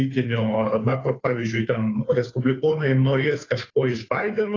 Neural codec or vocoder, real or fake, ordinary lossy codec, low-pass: codec, 16 kHz, 2 kbps, FunCodec, trained on Chinese and English, 25 frames a second; fake; AAC, 32 kbps; 7.2 kHz